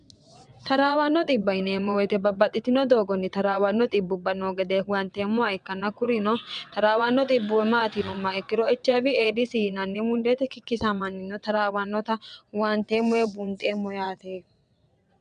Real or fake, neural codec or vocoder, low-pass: fake; vocoder, 22.05 kHz, 80 mel bands, WaveNeXt; 9.9 kHz